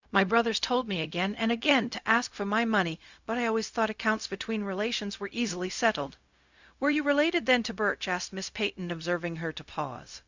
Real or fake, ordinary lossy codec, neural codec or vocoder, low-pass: fake; Opus, 64 kbps; codec, 16 kHz, 0.4 kbps, LongCat-Audio-Codec; 7.2 kHz